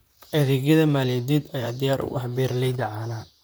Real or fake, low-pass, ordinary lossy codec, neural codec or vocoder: fake; none; none; vocoder, 44.1 kHz, 128 mel bands, Pupu-Vocoder